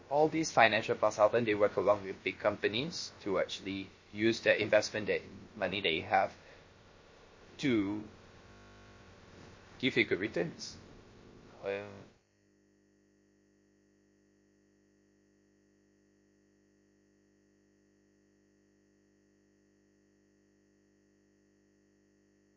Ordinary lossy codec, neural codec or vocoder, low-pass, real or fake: MP3, 32 kbps; codec, 16 kHz, about 1 kbps, DyCAST, with the encoder's durations; 7.2 kHz; fake